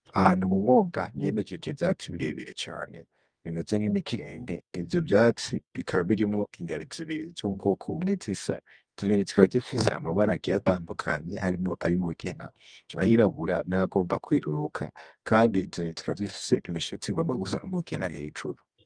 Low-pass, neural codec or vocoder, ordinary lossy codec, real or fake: 9.9 kHz; codec, 24 kHz, 0.9 kbps, WavTokenizer, medium music audio release; Opus, 32 kbps; fake